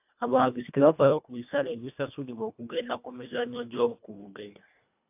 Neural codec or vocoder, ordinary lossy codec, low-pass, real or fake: codec, 24 kHz, 1.5 kbps, HILCodec; AAC, 32 kbps; 3.6 kHz; fake